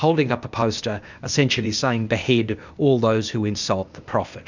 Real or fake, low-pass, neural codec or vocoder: fake; 7.2 kHz; codec, 16 kHz, 0.8 kbps, ZipCodec